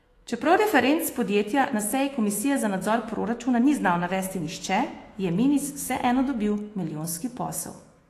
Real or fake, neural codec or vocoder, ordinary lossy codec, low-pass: fake; autoencoder, 48 kHz, 128 numbers a frame, DAC-VAE, trained on Japanese speech; AAC, 48 kbps; 14.4 kHz